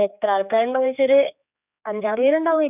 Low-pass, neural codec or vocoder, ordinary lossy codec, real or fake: 3.6 kHz; codec, 16 kHz, 4 kbps, X-Codec, HuBERT features, trained on general audio; none; fake